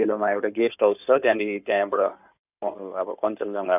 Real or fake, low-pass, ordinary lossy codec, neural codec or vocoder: fake; 3.6 kHz; none; codec, 16 kHz in and 24 kHz out, 2.2 kbps, FireRedTTS-2 codec